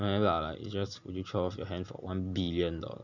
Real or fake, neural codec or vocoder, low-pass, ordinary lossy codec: real; none; 7.2 kHz; none